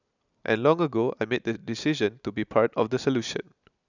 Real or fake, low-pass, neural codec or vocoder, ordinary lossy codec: real; 7.2 kHz; none; none